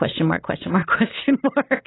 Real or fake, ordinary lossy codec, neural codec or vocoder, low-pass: real; AAC, 16 kbps; none; 7.2 kHz